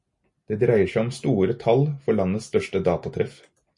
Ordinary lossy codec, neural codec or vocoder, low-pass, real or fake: MP3, 48 kbps; none; 10.8 kHz; real